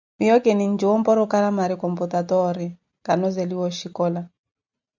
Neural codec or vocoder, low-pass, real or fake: none; 7.2 kHz; real